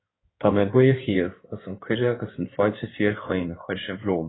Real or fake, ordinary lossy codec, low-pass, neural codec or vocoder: fake; AAC, 16 kbps; 7.2 kHz; codec, 16 kHz in and 24 kHz out, 2.2 kbps, FireRedTTS-2 codec